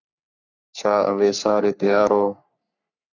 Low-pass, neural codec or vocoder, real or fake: 7.2 kHz; codec, 44.1 kHz, 3.4 kbps, Pupu-Codec; fake